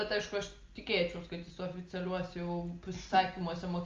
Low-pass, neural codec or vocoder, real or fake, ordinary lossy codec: 7.2 kHz; none; real; Opus, 32 kbps